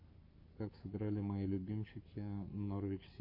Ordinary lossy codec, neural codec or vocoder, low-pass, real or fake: MP3, 32 kbps; codec, 44.1 kHz, 7.8 kbps, Pupu-Codec; 5.4 kHz; fake